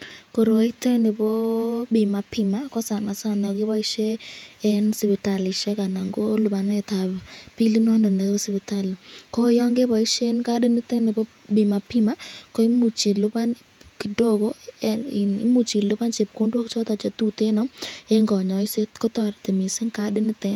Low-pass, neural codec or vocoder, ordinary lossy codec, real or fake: 19.8 kHz; vocoder, 48 kHz, 128 mel bands, Vocos; none; fake